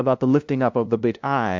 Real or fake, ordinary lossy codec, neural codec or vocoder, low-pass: fake; MP3, 64 kbps; codec, 16 kHz, 0.5 kbps, FunCodec, trained on LibriTTS, 25 frames a second; 7.2 kHz